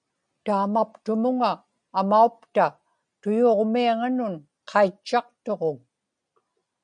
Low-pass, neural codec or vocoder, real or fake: 9.9 kHz; none; real